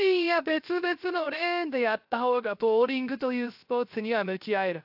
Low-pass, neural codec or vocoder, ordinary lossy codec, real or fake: 5.4 kHz; codec, 16 kHz, 0.3 kbps, FocalCodec; AAC, 48 kbps; fake